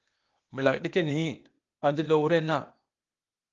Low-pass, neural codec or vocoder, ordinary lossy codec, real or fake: 7.2 kHz; codec, 16 kHz, 0.8 kbps, ZipCodec; Opus, 32 kbps; fake